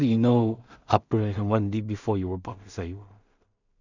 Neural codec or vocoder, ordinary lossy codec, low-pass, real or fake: codec, 16 kHz in and 24 kHz out, 0.4 kbps, LongCat-Audio-Codec, two codebook decoder; none; 7.2 kHz; fake